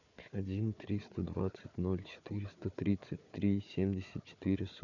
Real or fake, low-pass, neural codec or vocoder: fake; 7.2 kHz; codec, 16 kHz, 4 kbps, FunCodec, trained on Chinese and English, 50 frames a second